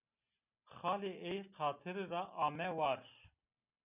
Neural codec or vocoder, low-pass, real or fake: none; 3.6 kHz; real